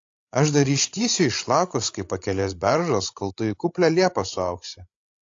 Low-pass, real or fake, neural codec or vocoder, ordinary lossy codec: 7.2 kHz; fake; codec, 16 kHz, 16 kbps, FreqCodec, larger model; AAC, 48 kbps